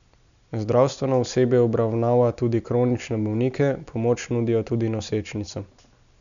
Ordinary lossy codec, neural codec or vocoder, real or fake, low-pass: none; none; real; 7.2 kHz